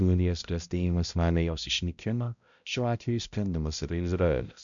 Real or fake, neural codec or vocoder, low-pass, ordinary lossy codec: fake; codec, 16 kHz, 0.5 kbps, X-Codec, HuBERT features, trained on balanced general audio; 7.2 kHz; MP3, 96 kbps